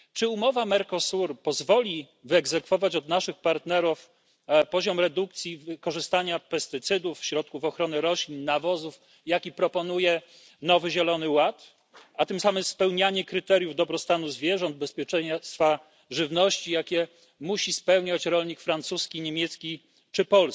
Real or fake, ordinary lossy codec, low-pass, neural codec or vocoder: real; none; none; none